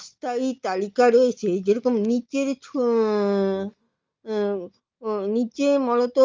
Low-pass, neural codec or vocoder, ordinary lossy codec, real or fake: 7.2 kHz; none; Opus, 32 kbps; real